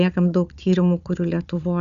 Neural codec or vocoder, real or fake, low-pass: codec, 16 kHz, 4 kbps, FunCodec, trained on Chinese and English, 50 frames a second; fake; 7.2 kHz